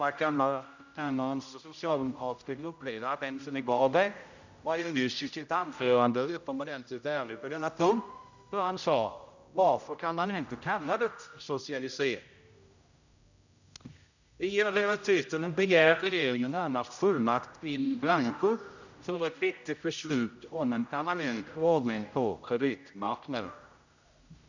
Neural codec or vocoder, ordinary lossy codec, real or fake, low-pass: codec, 16 kHz, 0.5 kbps, X-Codec, HuBERT features, trained on general audio; none; fake; 7.2 kHz